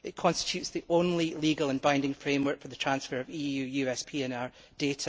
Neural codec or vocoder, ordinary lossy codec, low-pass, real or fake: none; none; none; real